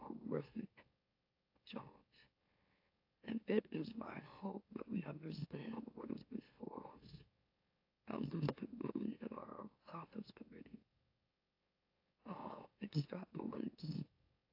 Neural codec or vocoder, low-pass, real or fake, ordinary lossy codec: autoencoder, 44.1 kHz, a latent of 192 numbers a frame, MeloTTS; 5.4 kHz; fake; AAC, 32 kbps